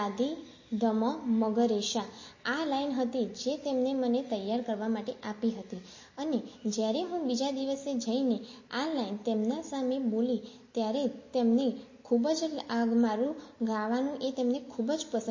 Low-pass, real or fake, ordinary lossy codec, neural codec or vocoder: 7.2 kHz; real; MP3, 32 kbps; none